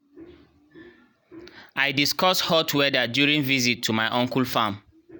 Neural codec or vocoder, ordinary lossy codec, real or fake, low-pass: none; none; real; none